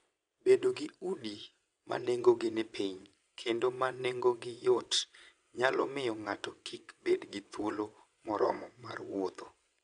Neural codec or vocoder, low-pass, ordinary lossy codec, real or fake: vocoder, 22.05 kHz, 80 mel bands, WaveNeXt; 9.9 kHz; MP3, 96 kbps; fake